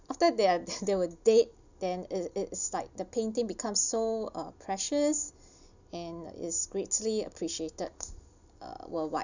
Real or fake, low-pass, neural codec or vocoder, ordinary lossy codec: real; 7.2 kHz; none; none